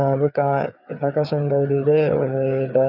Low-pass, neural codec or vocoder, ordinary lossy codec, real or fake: 5.4 kHz; codec, 16 kHz, 4 kbps, FreqCodec, larger model; none; fake